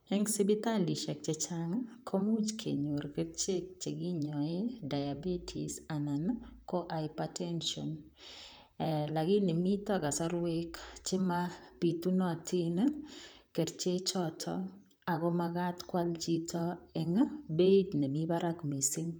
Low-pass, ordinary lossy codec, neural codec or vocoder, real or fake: none; none; vocoder, 44.1 kHz, 128 mel bands, Pupu-Vocoder; fake